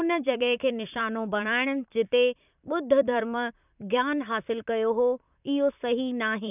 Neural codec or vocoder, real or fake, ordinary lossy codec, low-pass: vocoder, 44.1 kHz, 128 mel bands, Pupu-Vocoder; fake; none; 3.6 kHz